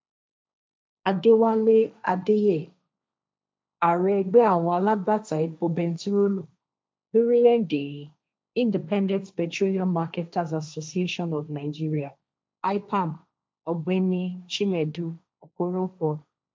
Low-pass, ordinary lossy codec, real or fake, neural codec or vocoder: none; none; fake; codec, 16 kHz, 1.1 kbps, Voila-Tokenizer